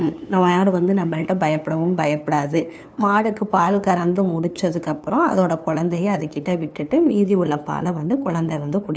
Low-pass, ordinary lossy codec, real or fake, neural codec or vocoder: none; none; fake; codec, 16 kHz, 2 kbps, FunCodec, trained on LibriTTS, 25 frames a second